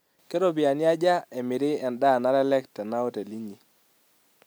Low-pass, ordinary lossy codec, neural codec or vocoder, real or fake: none; none; none; real